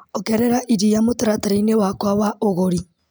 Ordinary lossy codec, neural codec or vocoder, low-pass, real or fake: none; none; none; real